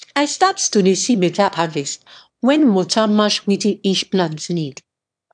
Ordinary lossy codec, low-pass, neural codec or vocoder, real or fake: none; 9.9 kHz; autoencoder, 22.05 kHz, a latent of 192 numbers a frame, VITS, trained on one speaker; fake